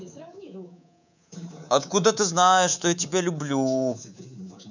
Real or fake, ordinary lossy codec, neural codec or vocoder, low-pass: fake; none; codec, 24 kHz, 3.1 kbps, DualCodec; 7.2 kHz